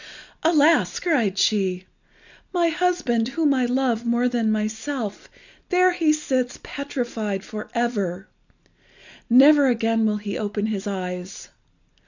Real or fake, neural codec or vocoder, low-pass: real; none; 7.2 kHz